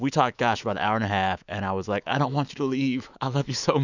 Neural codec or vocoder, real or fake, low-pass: autoencoder, 48 kHz, 128 numbers a frame, DAC-VAE, trained on Japanese speech; fake; 7.2 kHz